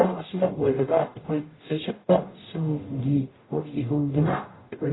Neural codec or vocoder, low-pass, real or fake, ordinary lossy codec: codec, 44.1 kHz, 0.9 kbps, DAC; 7.2 kHz; fake; AAC, 16 kbps